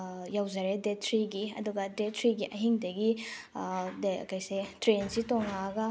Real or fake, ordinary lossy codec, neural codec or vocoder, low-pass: real; none; none; none